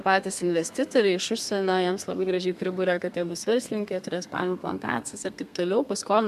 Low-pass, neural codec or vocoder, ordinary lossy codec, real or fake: 14.4 kHz; codec, 32 kHz, 1.9 kbps, SNAC; MP3, 96 kbps; fake